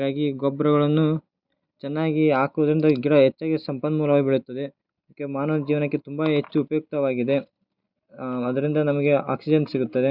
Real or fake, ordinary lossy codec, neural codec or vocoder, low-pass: real; Opus, 64 kbps; none; 5.4 kHz